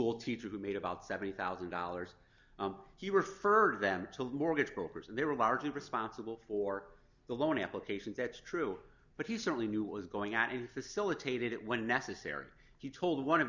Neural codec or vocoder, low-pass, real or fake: none; 7.2 kHz; real